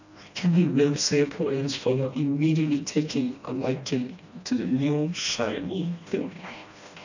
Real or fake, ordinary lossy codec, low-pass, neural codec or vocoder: fake; none; 7.2 kHz; codec, 16 kHz, 1 kbps, FreqCodec, smaller model